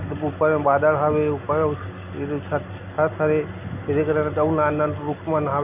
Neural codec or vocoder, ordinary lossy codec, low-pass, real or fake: none; none; 3.6 kHz; real